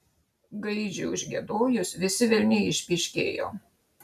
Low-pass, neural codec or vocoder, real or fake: 14.4 kHz; none; real